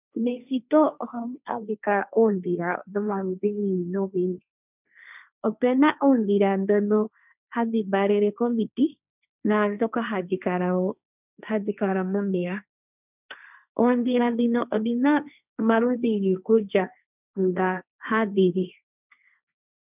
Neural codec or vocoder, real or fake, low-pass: codec, 16 kHz, 1.1 kbps, Voila-Tokenizer; fake; 3.6 kHz